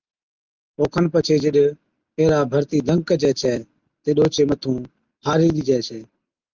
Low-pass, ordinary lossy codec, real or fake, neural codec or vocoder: 7.2 kHz; Opus, 32 kbps; real; none